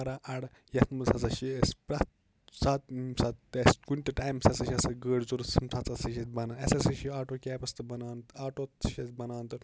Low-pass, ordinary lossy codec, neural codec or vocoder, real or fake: none; none; none; real